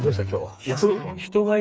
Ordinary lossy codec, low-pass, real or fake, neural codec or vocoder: none; none; fake; codec, 16 kHz, 4 kbps, FreqCodec, smaller model